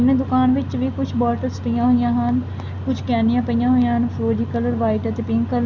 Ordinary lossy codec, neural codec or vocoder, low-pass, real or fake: none; none; 7.2 kHz; real